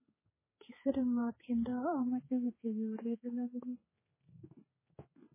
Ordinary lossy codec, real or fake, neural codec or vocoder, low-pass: MP3, 16 kbps; fake; codec, 16 kHz, 4 kbps, X-Codec, HuBERT features, trained on general audio; 3.6 kHz